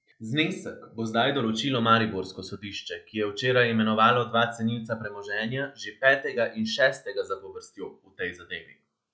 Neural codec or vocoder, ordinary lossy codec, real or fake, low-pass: none; none; real; none